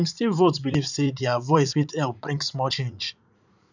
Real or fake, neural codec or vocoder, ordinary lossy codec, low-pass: fake; vocoder, 44.1 kHz, 80 mel bands, Vocos; none; 7.2 kHz